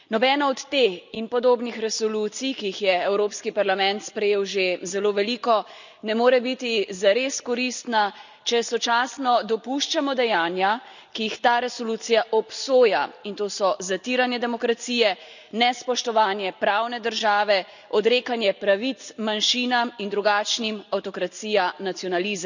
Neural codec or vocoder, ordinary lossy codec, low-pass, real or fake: none; none; 7.2 kHz; real